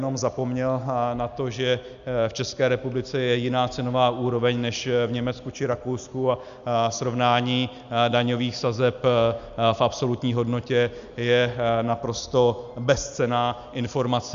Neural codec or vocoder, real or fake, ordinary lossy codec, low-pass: none; real; Opus, 64 kbps; 7.2 kHz